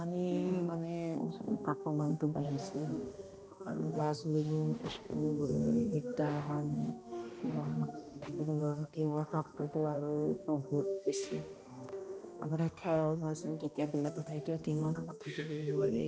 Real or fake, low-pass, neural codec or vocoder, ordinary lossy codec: fake; none; codec, 16 kHz, 1 kbps, X-Codec, HuBERT features, trained on balanced general audio; none